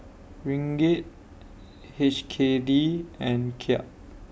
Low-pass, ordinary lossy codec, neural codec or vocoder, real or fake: none; none; none; real